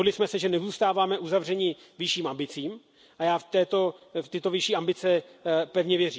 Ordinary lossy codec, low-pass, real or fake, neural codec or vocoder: none; none; real; none